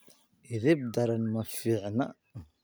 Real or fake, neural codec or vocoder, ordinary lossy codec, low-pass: real; none; none; none